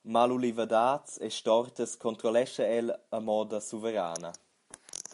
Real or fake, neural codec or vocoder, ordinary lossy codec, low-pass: real; none; AAC, 64 kbps; 10.8 kHz